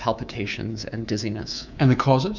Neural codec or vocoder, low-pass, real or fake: codec, 24 kHz, 3.1 kbps, DualCodec; 7.2 kHz; fake